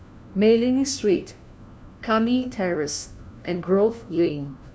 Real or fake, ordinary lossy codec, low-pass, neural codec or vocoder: fake; none; none; codec, 16 kHz, 1 kbps, FunCodec, trained on LibriTTS, 50 frames a second